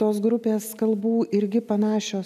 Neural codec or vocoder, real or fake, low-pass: none; real; 14.4 kHz